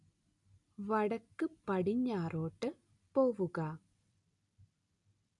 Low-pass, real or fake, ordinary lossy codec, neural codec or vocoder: 10.8 kHz; real; none; none